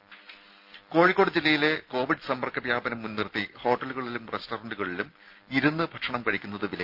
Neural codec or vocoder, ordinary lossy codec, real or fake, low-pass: none; Opus, 32 kbps; real; 5.4 kHz